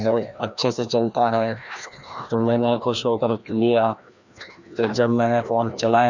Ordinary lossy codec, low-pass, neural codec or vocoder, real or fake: none; 7.2 kHz; codec, 16 kHz, 1 kbps, FreqCodec, larger model; fake